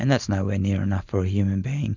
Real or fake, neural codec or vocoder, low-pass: real; none; 7.2 kHz